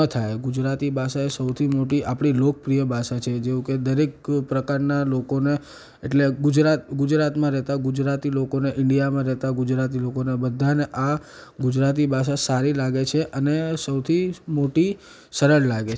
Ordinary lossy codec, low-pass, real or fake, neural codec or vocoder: none; none; real; none